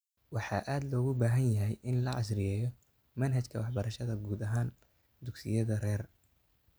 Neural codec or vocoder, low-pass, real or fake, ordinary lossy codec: none; none; real; none